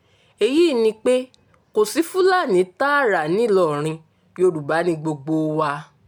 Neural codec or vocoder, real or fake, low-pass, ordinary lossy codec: none; real; 19.8 kHz; MP3, 96 kbps